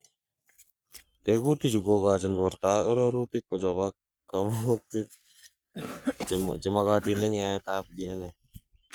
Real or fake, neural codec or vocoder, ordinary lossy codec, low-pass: fake; codec, 44.1 kHz, 3.4 kbps, Pupu-Codec; none; none